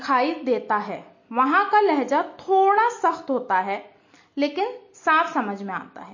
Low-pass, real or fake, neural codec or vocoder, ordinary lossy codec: 7.2 kHz; real; none; MP3, 32 kbps